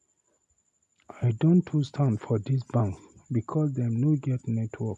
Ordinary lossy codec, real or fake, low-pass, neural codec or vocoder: none; real; none; none